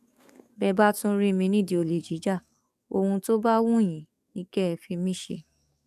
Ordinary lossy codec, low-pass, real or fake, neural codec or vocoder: none; 14.4 kHz; fake; codec, 44.1 kHz, 7.8 kbps, DAC